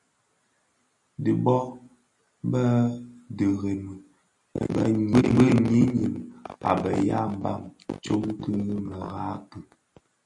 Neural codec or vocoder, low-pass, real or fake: none; 10.8 kHz; real